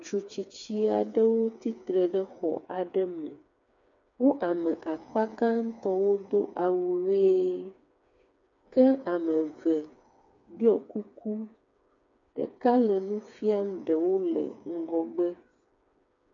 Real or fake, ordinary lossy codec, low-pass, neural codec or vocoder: fake; MP3, 96 kbps; 7.2 kHz; codec, 16 kHz, 4 kbps, FreqCodec, smaller model